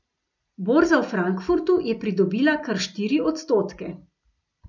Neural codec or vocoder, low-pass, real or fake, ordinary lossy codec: none; 7.2 kHz; real; none